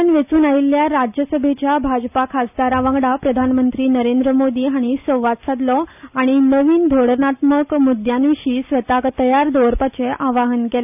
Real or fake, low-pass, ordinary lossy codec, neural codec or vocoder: real; 3.6 kHz; none; none